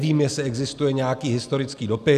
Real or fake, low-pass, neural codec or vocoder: real; 14.4 kHz; none